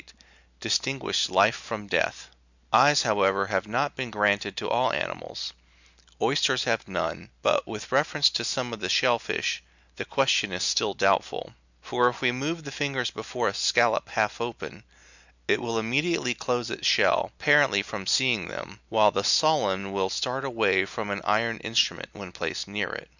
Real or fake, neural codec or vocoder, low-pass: real; none; 7.2 kHz